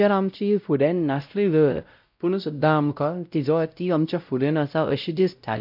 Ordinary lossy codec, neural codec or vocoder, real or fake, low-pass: none; codec, 16 kHz, 0.5 kbps, X-Codec, WavLM features, trained on Multilingual LibriSpeech; fake; 5.4 kHz